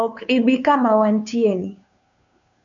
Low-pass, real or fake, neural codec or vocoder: 7.2 kHz; fake; codec, 16 kHz, 2 kbps, FunCodec, trained on Chinese and English, 25 frames a second